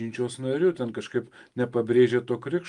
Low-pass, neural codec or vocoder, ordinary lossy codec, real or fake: 10.8 kHz; none; Opus, 32 kbps; real